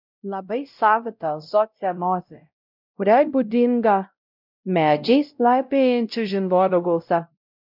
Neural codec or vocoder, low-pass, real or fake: codec, 16 kHz, 0.5 kbps, X-Codec, WavLM features, trained on Multilingual LibriSpeech; 5.4 kHz; fake